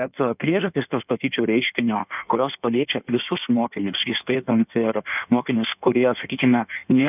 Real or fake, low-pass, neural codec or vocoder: fake; 3.6 kHz; codec, 16 kHz in and 24 kHz out, 1.1 kbps, FireRedTTS-2 codec